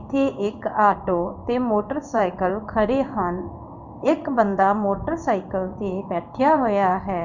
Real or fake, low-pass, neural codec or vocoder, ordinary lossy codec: fake; 7.2 kHz; codec, 16 kHz in and 24 kHz out, 1 kbps, XY-Tokenizer; none